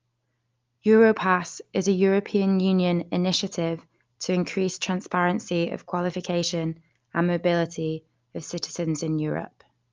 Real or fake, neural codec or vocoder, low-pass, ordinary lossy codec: real; none; 7.2 kHz; Opus, 32 kbps